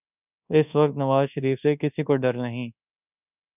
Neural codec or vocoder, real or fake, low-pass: codec, 24 kHz, 1.2 kbps, DualCodec; fake; 3.6 kHz